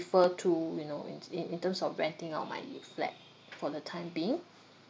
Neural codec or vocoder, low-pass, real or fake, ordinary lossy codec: none; none; real; none